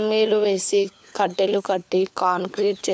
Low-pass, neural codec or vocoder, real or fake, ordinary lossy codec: none; codec, 16 kHz, 4 kbps, FunCodec, trained on LibriTTS, 50 frames a second; fake; none